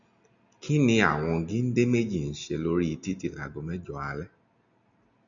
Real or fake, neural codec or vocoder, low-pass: real; none; 7.2 kHz